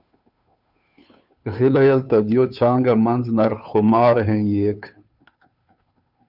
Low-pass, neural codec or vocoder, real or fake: 5.4 kHz; codec, 16 kHz, 2 kbps, FunCodec, trained on Chinese and English, 25 frames a second; fake